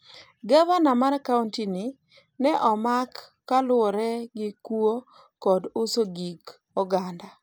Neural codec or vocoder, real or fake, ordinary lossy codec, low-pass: none; real; none; none